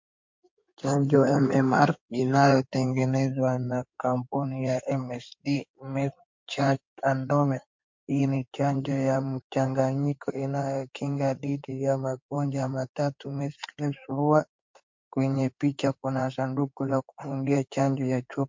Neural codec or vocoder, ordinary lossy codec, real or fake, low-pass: codec, 16 kHz in and 24 kHz out, 2.2 kbps, FireRedTTS-2 codec; MP3, 48 kbps; fake; 7.2 kHz